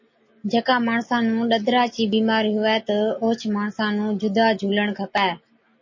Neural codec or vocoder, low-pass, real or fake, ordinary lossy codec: none; 7.2 kHz; real; MP3, 32 kbps